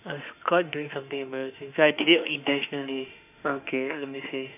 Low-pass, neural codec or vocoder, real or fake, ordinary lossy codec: 3.6 kHz; autoencoder, 48 kHz, 32 numbers a frame, DAC-VAE, trained on Japanese speech; fake; none